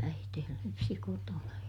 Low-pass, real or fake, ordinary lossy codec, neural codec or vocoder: 19.8 kHz; real; none; none